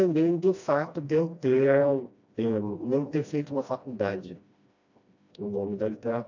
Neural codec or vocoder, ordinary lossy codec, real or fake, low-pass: codec, 16 kHz, 1 kbps, FreqCodec, smaller model; AAC, 48 kbps; fake; 7.2 kHz